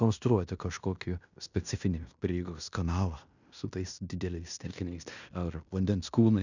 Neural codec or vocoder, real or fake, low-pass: codec, 16 kHz in and 24 kHz out, 0.9 kbps, LongCat-Audio-Codec, fine tuned four codebook decoder; fake; 7.2 kHz